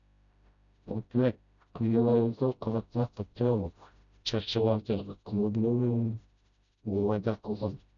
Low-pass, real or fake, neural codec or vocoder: 7.2 kHz; fake; codec, 16 kHz, 0.5 kbps, FreqCodec, smaller model